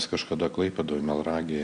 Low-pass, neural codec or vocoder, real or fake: 9.9 kHz; none; real